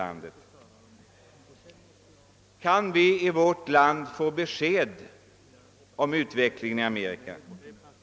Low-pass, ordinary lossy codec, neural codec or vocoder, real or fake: none; none; none; real